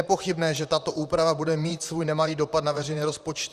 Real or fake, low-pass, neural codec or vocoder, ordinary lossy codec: fake; 14.4 kHz; vocoder, 44.1 kHz, 128 mel bands, Pupu-Vocoder; Opus, 64 kbps